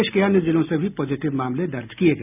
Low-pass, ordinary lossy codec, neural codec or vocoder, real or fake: 3.6 kHz; none; none; real